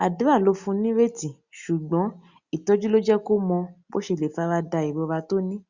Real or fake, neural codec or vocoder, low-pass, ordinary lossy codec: real; none; 7.2 kHz; Opus, 64 kbps